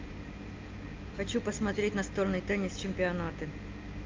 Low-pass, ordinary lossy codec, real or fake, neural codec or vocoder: 7.2 kHz; Opus, 24 kbps; real; none